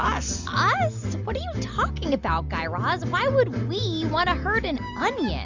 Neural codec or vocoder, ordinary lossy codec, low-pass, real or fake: none; Opus, 64 kbps; 7.2 kHz; real